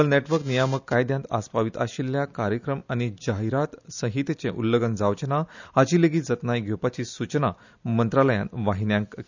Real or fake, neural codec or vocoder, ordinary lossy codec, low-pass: real; none; none; 7.2 kHz